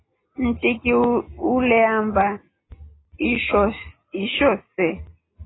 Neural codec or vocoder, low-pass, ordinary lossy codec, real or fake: none; 7.2 kHz; AAC, 16 kbps; real